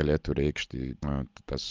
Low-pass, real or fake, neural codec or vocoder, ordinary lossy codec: 7.2 kHz; real; none; Opus, 24 kbps